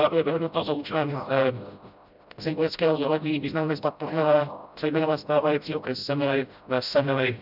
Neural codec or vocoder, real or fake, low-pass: codec, 16 kHz, 0.5 kbps, FreqCodec, smaller model; fake; 5.4 kHz